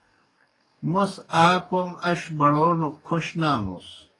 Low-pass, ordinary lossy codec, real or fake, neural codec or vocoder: 10.8 kHz; AAC, 32 kbps; fake; codec, 44.1 kHz, 2.6 kbps, DAC